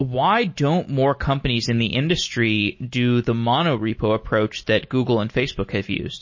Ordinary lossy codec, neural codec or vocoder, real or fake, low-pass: MP3, 32 kbps; none; real; 7.2 kHz